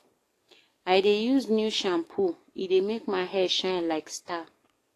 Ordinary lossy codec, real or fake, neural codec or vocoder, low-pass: AAC, 48 kbps; fake; codec, 44.1 kHz, 7.8 kbps, DAC; 14.4 kHz